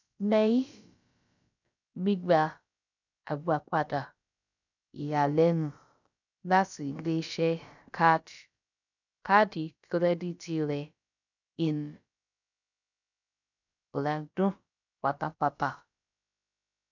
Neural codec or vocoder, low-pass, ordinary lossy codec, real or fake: codec, 16 kHz, about 1 kbps, DyCAST, with the encoder's durations; 7.2 kHz; none; fake